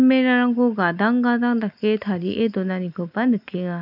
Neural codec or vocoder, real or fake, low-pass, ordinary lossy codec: none; real; 5.4 kHz; none